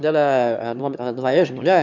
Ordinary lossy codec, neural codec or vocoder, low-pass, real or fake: none; autoencoder, 22.05 kHz, a latent of 192 numbers a frame, VITS, trained on one speaker; 7.2 kHz; fake